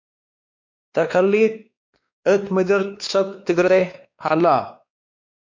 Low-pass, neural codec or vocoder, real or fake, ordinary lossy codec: 7.2 kHz; codec, 16 kHz, 2 kbps, X-Codec, WavLM features, trained on Multilingual LibriSpeech; fake; MP3, 48 kbps